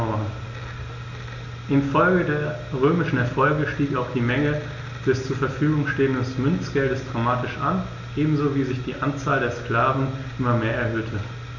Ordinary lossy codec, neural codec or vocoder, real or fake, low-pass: none; none; real; 7.2 kHz